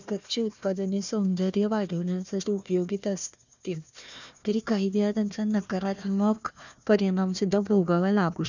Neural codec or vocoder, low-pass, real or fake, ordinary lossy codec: codec, 16 kHz, 1 kbps, FunCodec, trained on Chinese and English, 50 frames a second; 7.2 kHz; fake; none